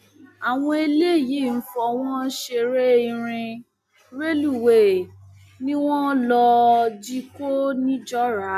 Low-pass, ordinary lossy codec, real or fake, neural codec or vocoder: 14.4 kHz; none; real; none